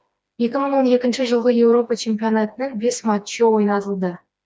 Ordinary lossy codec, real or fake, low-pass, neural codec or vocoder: none; fake; none; codec, 16 kHz, 2 kbps, FreqCodec, smaller model